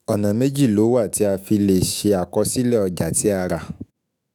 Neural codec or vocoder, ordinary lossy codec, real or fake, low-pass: autoencoder, 48 kHz, 128 numbers a frame, DAC-VAE, trained on Japanese speech; none; fake; none